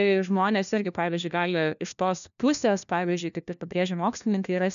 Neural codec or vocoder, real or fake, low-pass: codec, 16 kHz, 1 kbps, FunCodec, trained on LibriTTS, 50 frames a second; fake; 7.2 kHz